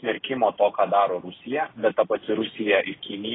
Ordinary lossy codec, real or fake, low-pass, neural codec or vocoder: AAC, 16 kbps; real; 7.2 kHz; none